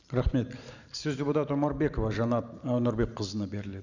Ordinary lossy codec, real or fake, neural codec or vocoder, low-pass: none; fake; vocoder, 44.1 kHz, 128 mel bands every 512 samples, BigVGAN v2; 7.2 kHz